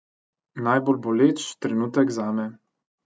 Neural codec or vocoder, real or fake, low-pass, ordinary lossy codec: none; real; none; none